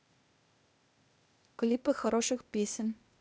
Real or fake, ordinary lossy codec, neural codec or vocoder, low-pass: fake; none; codec, 16 kHz, 0.8 kbps, ZipCodec; none